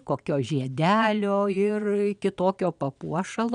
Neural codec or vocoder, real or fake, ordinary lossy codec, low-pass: vocoder, 22.05 kHz, 80 mel bands, Vocos; fake; MP3, 96 kbps; 9.9 kHz